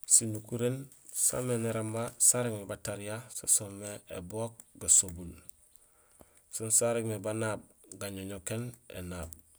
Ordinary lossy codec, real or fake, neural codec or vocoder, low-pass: none; real; none; none